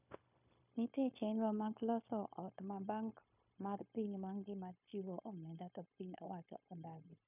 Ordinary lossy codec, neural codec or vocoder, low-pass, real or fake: none; codec, 16 kHz, 0.9 kbps, LongCat-Audio-Codec; 3.6 kHz; fake